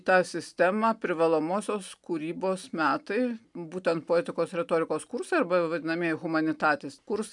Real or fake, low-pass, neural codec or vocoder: real; 10.8 kHz; none